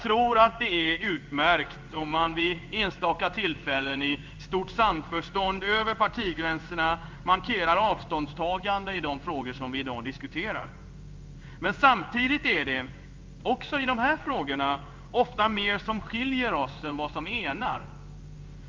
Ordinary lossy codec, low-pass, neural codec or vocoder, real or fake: Opus, 24 kbps; 7.2 kHz; codec, 16 kHz in and 24 kHz out, 1 kbps, XY-Tokenizer; fake